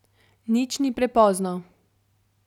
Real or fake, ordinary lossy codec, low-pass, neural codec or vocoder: real; none; 19.8 kHz; none